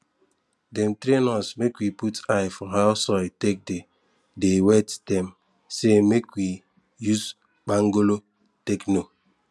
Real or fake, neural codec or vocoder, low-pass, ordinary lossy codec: real; none; none; none